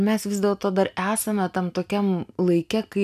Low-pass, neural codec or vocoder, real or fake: 14.4 kHz; none; real